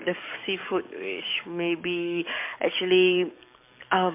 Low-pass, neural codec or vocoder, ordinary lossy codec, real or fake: 3.6 kHz; codec, 44.1 kHz, 7.8 kbps, DAC; MP3, 32 kbps; fake